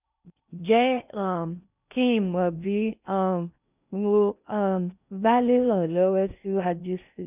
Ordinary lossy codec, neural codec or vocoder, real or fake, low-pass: none; codec, 16 kHz in and 24 kHz out, 0.6 kbps, FocalCodec, streaming, 4096 codes; fake; 3.6 kHz